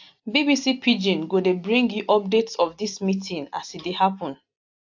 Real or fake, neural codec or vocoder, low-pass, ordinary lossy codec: real; none; 7.2 kHz; AAC, 48 kbps